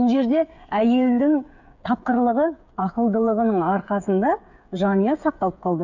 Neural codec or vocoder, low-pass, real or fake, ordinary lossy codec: codec, 16 kHz, 8 kbps, FreqCodec, smaller model; 7.2 kHz; fake; none